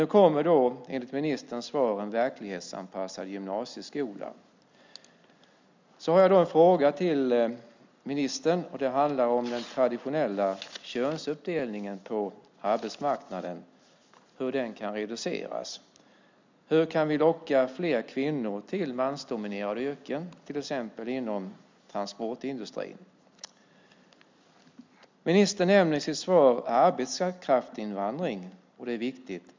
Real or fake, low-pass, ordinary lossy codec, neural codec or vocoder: real; 7.2 kHz; MP3, 64 kbps; none